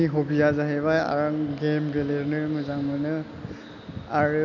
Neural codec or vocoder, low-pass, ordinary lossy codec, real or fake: none; 7.2 kHz; none; real